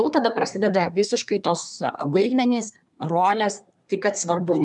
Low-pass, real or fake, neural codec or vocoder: 10.8 kHz; fake; codec, 24 kHz, 1 kbps, SNAC